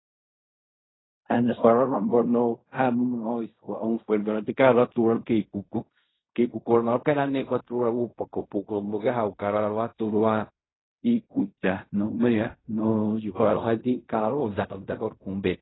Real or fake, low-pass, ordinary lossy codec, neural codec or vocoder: fake; 7.2 kHz; AAC, 16 kbps; codec, 16 kHz in and 24 kHz out, 0.4 kbps, LongCat-Audio-Codec, fine tuned four codebook decoder